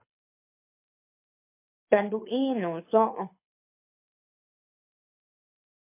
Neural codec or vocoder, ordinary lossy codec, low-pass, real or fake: codec, 24 kHz, 6 kbps, HILCodec; MP3, 24 kbps; 3.6 kHz; fake